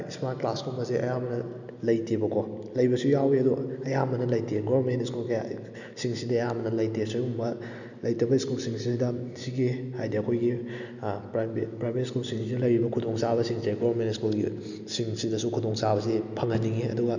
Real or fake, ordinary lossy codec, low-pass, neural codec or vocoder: real; none; 7.2 kHz; none